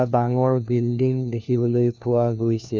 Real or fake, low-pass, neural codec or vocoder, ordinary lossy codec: fake; none; codec, 16 kHz, 1 kbps, FunCodec, trained on LibriTTS, 50 frames a second; none